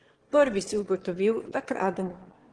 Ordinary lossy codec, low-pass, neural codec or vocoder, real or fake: Opus, 16 kbps; 9.9 kHz; autoencoder, 22.05 kHz, a latent of 192 numbers a frame, VITS, trained on one speaker; fake